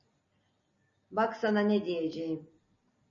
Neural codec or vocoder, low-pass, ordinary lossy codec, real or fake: none; 7.2 kHz; MP3, 32 kbps; real